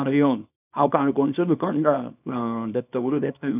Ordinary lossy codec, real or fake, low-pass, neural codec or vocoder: none; fake; 3.6 kHz; codec, 24 kHz, 0.9 kbps, WavTokenizer, small release